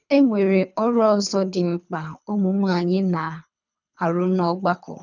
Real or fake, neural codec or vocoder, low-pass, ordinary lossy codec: fake; codec, 24 kHz, 3 kbps, HILCodec; 7.2 kHz; none